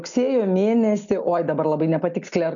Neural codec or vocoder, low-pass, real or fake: none; 7.2 kHz; real